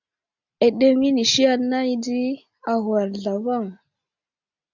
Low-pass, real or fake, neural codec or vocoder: 7.2 kHz; real; none